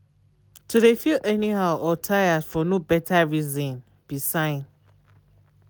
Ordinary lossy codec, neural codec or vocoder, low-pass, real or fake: none; none; none; real